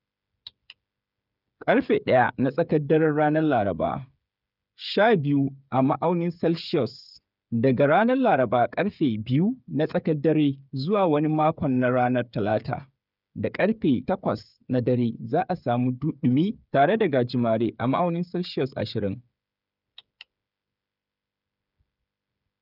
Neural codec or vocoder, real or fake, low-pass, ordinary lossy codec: codec, 16 kHz, 8 kbps, FreqCodec, smaller model; fake; 5.4 kHz; none